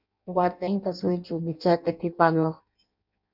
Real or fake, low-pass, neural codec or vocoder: fake; 5.4 kHz; codec, 16 kHz in and 24 kHz out, 0.6 kbps, FireRedTTS-2 codec